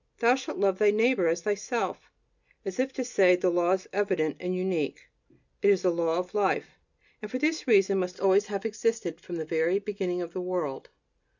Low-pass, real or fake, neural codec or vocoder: 7.2 kHz; real; none